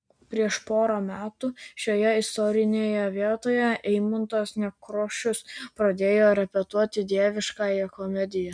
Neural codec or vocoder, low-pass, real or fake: none; 9.9 kHz; real